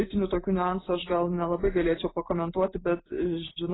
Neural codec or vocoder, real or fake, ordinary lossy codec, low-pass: none; real; AAC, 16 kbps; 7.2 kHz